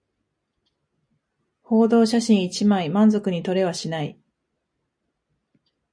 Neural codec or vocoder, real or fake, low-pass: none; real; 9.9 kHz